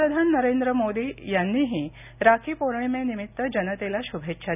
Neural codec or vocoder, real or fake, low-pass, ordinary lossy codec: none; real; 3.6 kHz; none